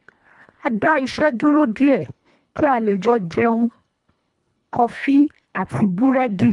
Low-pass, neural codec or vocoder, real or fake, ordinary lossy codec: 10.8 kHz; codec, 24 kHz, 1.5 kbps, HILCodec; fake; none